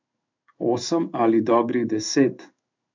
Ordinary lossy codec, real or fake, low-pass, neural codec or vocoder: MP3, 64 kbps; fake; 7.2 kHz; codec, 16 kHz in and 24 kHz out, 1 kbps, XY-Tokenizer